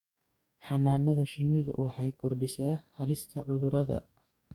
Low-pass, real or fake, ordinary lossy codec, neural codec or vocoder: 19.8 kHz; fake; none; codec, 44.1 kHz, 2.6 kbps, DAC